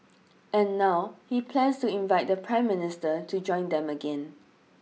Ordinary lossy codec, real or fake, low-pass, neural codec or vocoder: none; real; none; none